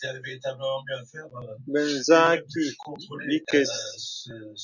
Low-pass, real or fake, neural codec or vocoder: 7.2 kHz; real; none